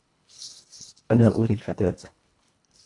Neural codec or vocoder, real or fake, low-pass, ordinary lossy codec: codec, 24 kHz, 1.5 kbps, HILCodec; fake; 10.8 kHz; AAC, 48 kbps